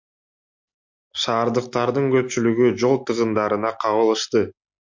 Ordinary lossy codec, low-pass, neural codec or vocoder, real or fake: MP3, 48 kbps; 7.2 kHz; none; real